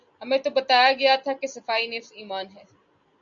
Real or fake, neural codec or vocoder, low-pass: real; none; 7.2 kHz